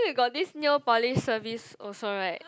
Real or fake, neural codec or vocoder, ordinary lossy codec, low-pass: real; none; none; none